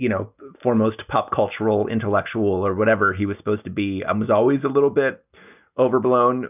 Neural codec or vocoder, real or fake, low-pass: none; real; 3.6 kHz